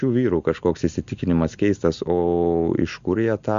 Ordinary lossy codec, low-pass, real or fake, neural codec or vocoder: Opus, 64 kbps; 7.2 kHz; real; none